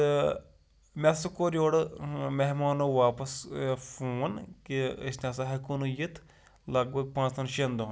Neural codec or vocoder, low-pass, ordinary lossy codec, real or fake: none; none; none; real